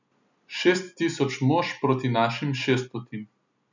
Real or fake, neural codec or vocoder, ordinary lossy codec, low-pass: real; none; none; 7.2 kHz